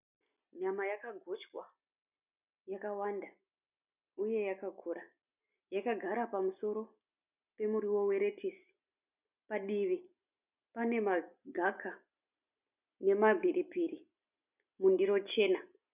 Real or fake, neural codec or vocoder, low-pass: real; none; 3.6 kHz